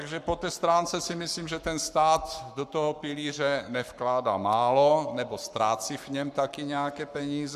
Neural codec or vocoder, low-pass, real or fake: codec, 44.1 kHz, 7.8 kbps, Pupu-Codec; 14.4 kHz; fake